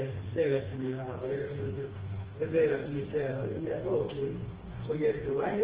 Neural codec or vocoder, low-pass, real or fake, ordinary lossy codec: codec, 16 kHz, 2 kbps, FreqCodec, smaller model; 3.6 kHz; fake; Opus, 16 kbps